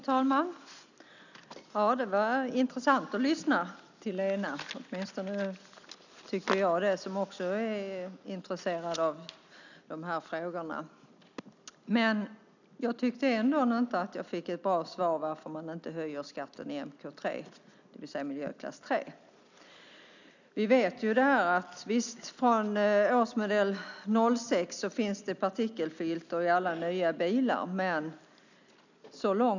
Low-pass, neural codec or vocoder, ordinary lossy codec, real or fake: 7.2 kHz; none; none; real